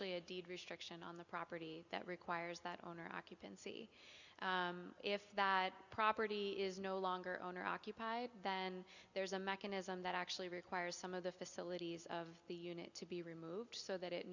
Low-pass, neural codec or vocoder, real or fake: 7.2 kHz; none; real